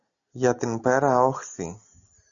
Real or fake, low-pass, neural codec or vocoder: real; 7.2 kHz; none